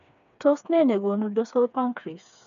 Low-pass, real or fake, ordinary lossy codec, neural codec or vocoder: 7.2 kHz; fake; none; codec, 16 kHz, 4 kbps, FreqCodec, smaller model